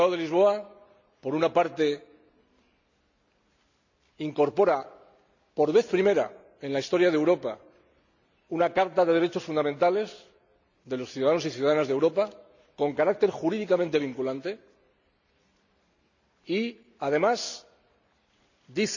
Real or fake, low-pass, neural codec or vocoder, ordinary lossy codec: real; 7.2 kHz; none; none